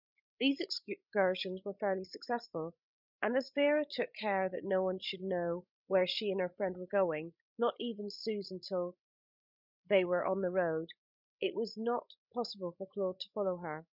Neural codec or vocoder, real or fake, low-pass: codec, 16 kHz, 16 kbps, FreqCodec, larger model; fake; 5.4 kHz